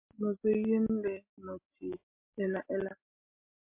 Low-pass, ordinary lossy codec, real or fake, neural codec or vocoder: 3.6 kHz; MP3, 32 kbps; real; none